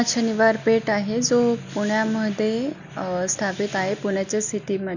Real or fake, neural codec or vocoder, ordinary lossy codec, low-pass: real; none; none; 7.2 kHz